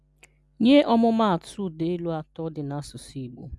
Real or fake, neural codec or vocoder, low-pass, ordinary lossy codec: real; none; none; none